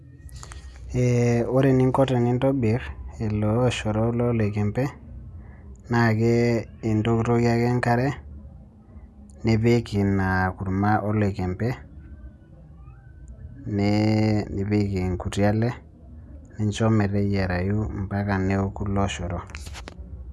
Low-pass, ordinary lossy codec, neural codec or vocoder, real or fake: none; none; none; real